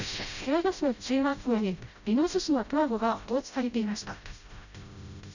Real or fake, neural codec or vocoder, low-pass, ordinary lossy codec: fake; codec, 16 kHz, 0.5 kbps, FreqCodec, smaller model; 7.2 kHz; none